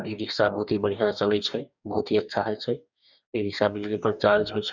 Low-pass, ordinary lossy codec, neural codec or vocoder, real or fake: 7.2 kHz; none; codec, 44.1 kHz, 2.6 kbps, DAC; fake